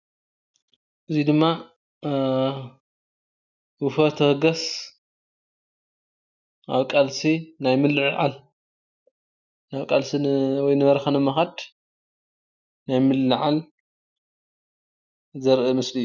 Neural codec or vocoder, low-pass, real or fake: none; 7.2 kHz; real